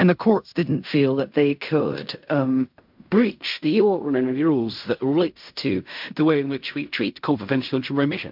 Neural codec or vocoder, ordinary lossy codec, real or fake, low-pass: codec, 16 kHz in and 24 kHz out, 0.4 kbps, LongCat-Audio-Codec, fine tuned four codebook decoder; MP3, 48 kbps; fake; 5.4 kHz